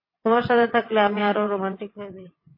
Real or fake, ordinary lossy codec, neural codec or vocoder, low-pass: fake; MP3, 24 kbps; vocoder, 22.05 kHz, 80 mel bands, WaveNeXt; 5.4 kHz